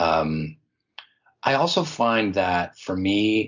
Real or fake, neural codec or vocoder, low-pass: real; none; 7.2 kHz